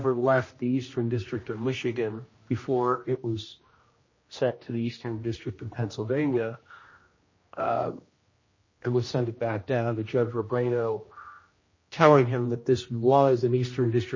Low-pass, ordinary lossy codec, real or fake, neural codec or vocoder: 7.2 kHz; MP3, 32 kbps; fake; codec, 16 kHz, 1 kbps, X-Codec, HuBERT features, trained on general audio